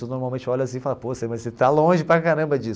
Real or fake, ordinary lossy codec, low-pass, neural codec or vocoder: real; none; none; none